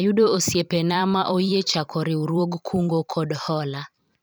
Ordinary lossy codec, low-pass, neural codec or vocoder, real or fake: none; none; vocoder, 44.1 kHz, 128 mel bands every 512 samples, BigVGAN v2; fake